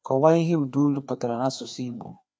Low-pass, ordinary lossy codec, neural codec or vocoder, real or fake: none; none; codec, 16 kHz, 2 kbps, FreqCodec, larger model; fake